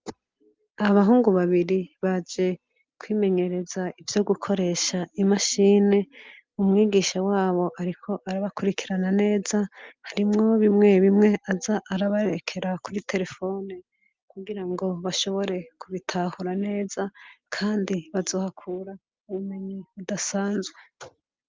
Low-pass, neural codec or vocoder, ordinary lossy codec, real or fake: 7.2 kHz; none; Opus, 32 kbps; real